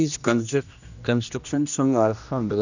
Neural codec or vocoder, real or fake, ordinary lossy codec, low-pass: codec, 16 kHz, 1 kbps, X-Codec, HuBERT features, trained on general audio; fake; none; 7.2 kHz